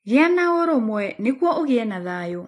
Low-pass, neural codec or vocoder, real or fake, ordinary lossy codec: 14.4 kHz; none; real; AAC, 48 kbps